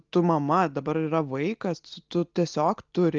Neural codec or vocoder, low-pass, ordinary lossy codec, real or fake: none; 7.2 kHz; Opus, 32 kbps; real